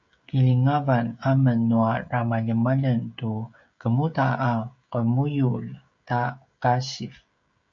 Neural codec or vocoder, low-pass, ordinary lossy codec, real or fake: codec, 16 kHz, 16 kbps, FreqCodec, smaller model; 7.2 kHz; MP3, 48 kbps; fake